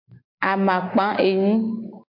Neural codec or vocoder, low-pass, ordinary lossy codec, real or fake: none; 5.4 kHz; MP3, 48 kbps; real